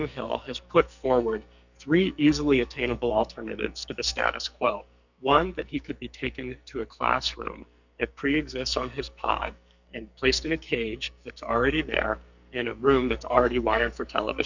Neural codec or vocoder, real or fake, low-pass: codec, 44.1 kHz, 2.6 kbps, SNAC; fake; 7.2 kHz